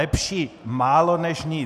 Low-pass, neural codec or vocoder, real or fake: 14.4 kHz; none; real